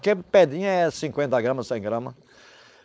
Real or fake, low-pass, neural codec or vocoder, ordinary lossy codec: fake; none; codec, 16 kHz, 4.8 kbps, FACodec; none